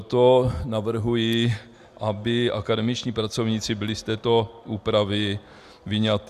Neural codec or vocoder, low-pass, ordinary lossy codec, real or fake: none; 14.4 kHz; Opus, 64 kbps; real